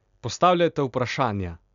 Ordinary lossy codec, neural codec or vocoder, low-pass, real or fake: none; none; 7.2 kHz; real